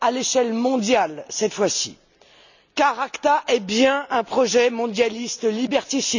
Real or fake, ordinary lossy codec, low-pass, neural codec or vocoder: real; none; 7.2 kHz; none